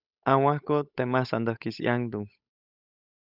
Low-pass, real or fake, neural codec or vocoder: 5.4 kHz; fake; codec, 16 kHz, 8 kbps, FunCodec, trained on Chinese and English, 25 frames a second